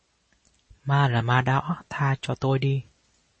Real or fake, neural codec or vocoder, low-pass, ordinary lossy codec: real; none; 10.8 kHz; MP3, 32 kbps